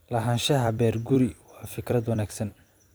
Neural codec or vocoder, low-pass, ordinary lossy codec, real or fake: vocoder, 44.1 kHz, 128 mel bands every 256 samples, BigVGAN v2; none; none; fake